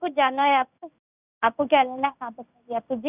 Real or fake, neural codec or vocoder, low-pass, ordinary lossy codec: fake; codec, 16 kHz in and 24 kHz out, 1 kbps, XY-Tokenizer; 3.6 kHz; none